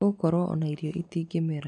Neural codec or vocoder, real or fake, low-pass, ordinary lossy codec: none; real; 10.8 kHz; none